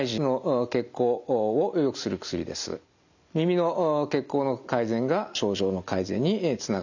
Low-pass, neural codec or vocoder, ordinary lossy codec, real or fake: 7.2 kHz; none; none; real